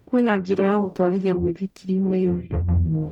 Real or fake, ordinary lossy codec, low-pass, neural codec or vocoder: fake; none; 19.8 kHz; codec, 44.1 kHz, 0.9 kbps, DAC